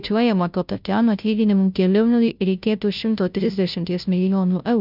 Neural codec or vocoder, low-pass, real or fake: codec, 16 kHz, 0.5 kbps, FunCodec, trained on Chinese and English, 25 frames a second; 5.4 kHz; fake